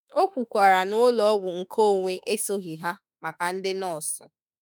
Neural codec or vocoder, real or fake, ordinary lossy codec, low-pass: autoencoder, 48 kHz, 32 numbers a frame, DAC-VAE, trained on Japanese speech; fake; none; none